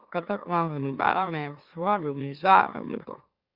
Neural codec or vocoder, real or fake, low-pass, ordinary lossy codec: autoencoder, 44.1 kHz, a latent of 192 numbers a frame, MeloTTS; fake; 5.4 kHz; Opus, 64 kbps